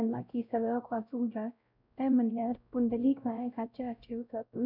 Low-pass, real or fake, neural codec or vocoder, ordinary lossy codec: 5.4 kHz; fake; codec, 16 kHz, 0.5 kbps, X-Codec, WavLM features, trained on Multilingual LibriSpeech; none